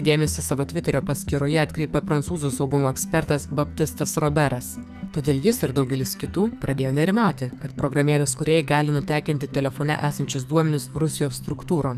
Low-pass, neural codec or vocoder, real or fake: 14.4 kHz; codec, 44.1 kHz, 2.6 kbps, SNAC; fake